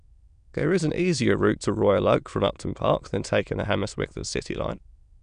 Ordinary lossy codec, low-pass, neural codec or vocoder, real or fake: none; 9.9 kHz; autoencoder, 22.05 kHz, a latent of 192 numbers a frame, VITS, trained on many speakers; fake